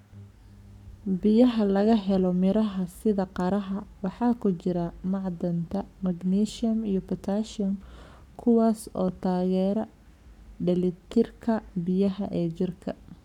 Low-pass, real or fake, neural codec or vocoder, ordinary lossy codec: 19.8 kHz; fake; codec, 44.1 kHz, 7.8 kbps, Pupu-Codec; none